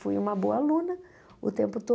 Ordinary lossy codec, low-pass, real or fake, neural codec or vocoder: none; none; real; none